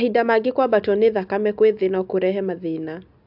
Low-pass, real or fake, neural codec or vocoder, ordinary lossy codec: 5.4 kHz; real; none; AAC, 48 kbps